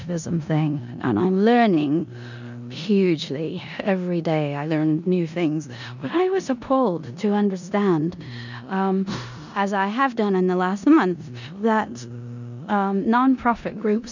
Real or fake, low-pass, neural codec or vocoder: fake; 7.2 kHz; codec, 16 kHz in and 24 kHz out, 0.9 kbps, LongCat-Audio-Codec, four codebook decoder